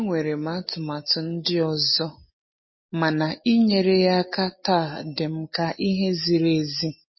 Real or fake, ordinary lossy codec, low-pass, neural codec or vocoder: real; MP3, 24 kbps; 7.2 kHz; none